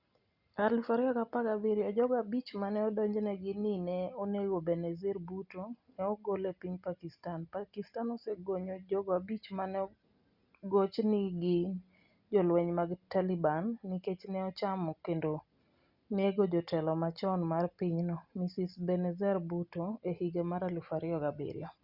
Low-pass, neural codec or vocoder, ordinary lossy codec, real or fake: 5.4 kHz; none; Opus, 64 kbps; real